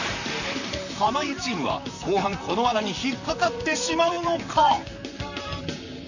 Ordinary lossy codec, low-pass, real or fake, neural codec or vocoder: none; 7.2 kHz; fake; vocoder, 44.1 kHz, 128 mel bands, Pupu-Vocoder